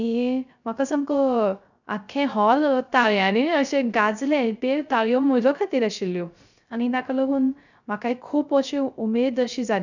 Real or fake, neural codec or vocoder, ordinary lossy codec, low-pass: fake; codec, 16 kHz, 0.3 kbps, FocalCodec; none; 7.2 kHz